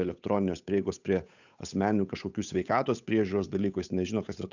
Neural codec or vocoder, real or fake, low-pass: codec, 16 kHz, 8 kbps, FunCodec, trained on Chinese and English, 25 frames a second; fake; 7.2 kHz